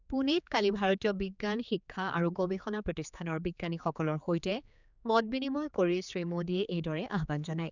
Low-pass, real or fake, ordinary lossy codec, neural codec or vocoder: 7.2 kHz; fake; none; codec, 16 kHz, 4 kbps, X-Codec, HuBERT features, trained on general audio